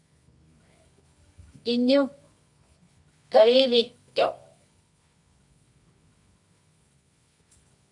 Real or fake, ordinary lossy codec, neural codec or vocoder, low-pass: fake; MP3, 96 kbps; codec, 24 kHz, 0.9 kbps, WavTokenizer, medium music audio release; 10.8 kHz